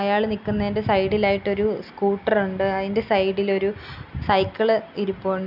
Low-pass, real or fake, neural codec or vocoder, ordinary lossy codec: 5.4 kHz; real; none; none